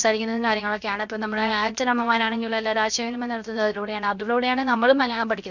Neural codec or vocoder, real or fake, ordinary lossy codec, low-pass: codec, 16 kHz, 0.7 kbps, FocalCodec; fake; none; 7.2 kHz